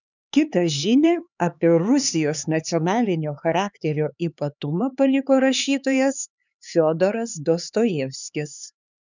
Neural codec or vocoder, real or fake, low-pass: codec, 16 kHz, 4 kbps, X-Codec, HuBERT features, trained on LibriSpeech; fake; 7.2 kHz